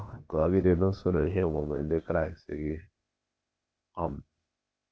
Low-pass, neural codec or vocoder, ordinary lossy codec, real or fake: none; codec, 16 kHz, 0.8 kbps, ZipCodec; none; fake